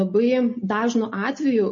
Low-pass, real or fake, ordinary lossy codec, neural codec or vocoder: 7.2 kHz; real; MP3, 32 kbps; none